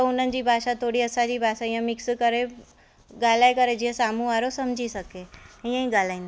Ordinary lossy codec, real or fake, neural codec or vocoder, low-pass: none; real; none; none